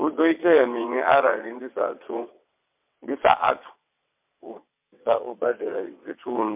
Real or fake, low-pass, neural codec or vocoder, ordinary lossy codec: fake; 3.6 kHz; vocoder, 22.05 kHz, 80 mel bands, WaveNeXt; MP3, 32 kbps